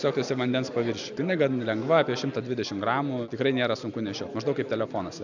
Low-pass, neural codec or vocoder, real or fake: 7.2 kHz; none; real